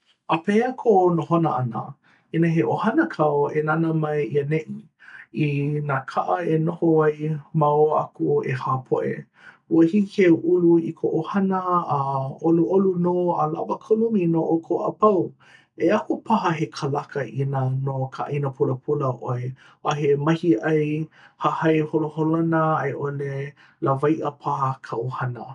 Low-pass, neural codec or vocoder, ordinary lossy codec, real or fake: 10.8 kHz; none; none; real